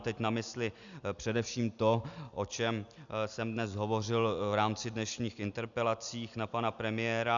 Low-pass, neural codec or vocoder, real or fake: 7.2 kHz; none; real